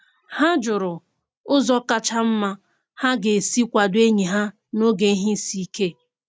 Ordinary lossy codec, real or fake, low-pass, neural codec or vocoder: none; real; none; none